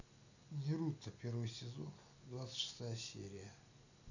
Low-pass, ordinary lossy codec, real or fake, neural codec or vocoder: 7.2 kHz; none; real; none